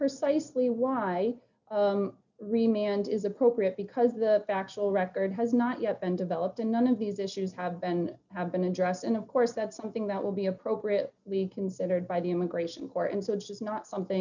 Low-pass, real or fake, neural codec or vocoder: 7.2 kHz; real; none